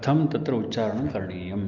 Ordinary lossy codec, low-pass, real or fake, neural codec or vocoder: Opus, 24 kbps; 7.2 kHz; real; none